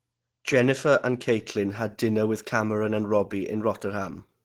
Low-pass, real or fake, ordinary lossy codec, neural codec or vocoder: 19.8 kHz; real; Opus, 16 kbps; none